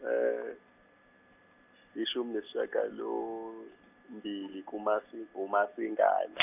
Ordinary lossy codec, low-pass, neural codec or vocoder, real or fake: none; 3.6 kHz; none; real